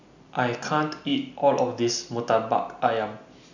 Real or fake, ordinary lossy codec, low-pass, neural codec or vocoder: real; none; 7.2 kHz; none